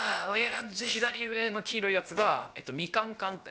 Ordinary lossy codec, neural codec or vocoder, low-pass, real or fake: none; codec, 16 kHz, about 1 kbps, DyCAST, with the encoder's durations; none; fake